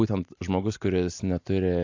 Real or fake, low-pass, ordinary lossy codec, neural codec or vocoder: real; 7.2 kHz; AAC, 48 kbps; none